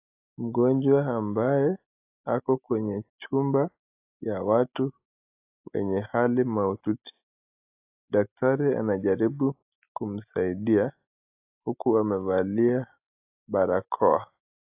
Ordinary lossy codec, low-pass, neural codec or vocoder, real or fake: AAC, 32 kbps; 3.6 kHz; none; real